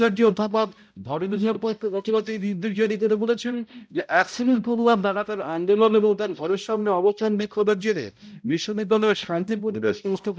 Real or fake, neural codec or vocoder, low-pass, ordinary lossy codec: fake; codec, 16 kHz, 0.5 kbps, X-Codec, HuBERT features, trained on balanced general audio; none; none